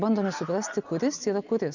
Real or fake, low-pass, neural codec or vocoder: real; 7.2 kHz; none